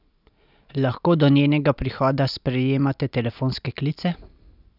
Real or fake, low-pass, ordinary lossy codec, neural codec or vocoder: real; 5.4 kHz; none; none